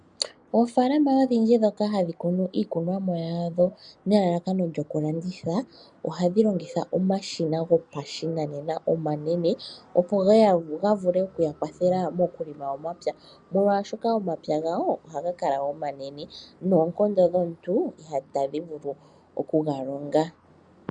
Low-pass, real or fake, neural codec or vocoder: 9.9 kHz; real; none